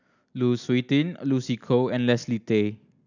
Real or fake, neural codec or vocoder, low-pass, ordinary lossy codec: real; none; 7.2 kHz; none